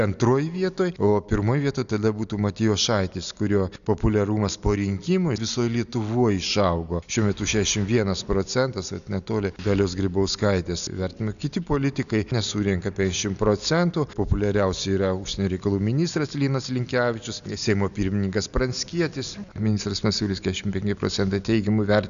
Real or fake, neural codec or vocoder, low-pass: real; none; 7.2 kHz